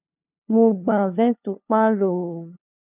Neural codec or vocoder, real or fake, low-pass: codec, 16 kHz, 2 kbps, FunCodec, trained on LibriTTS, 25 frames a second; fake; 3.6 kHz